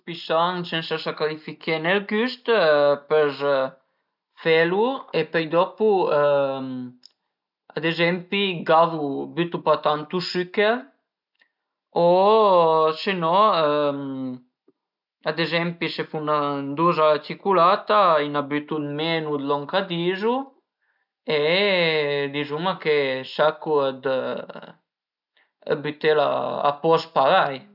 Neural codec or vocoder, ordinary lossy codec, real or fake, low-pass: none; none; real; 5.4 kHz